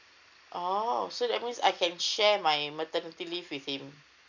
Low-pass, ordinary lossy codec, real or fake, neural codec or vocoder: 7.2 kHz; none; real; none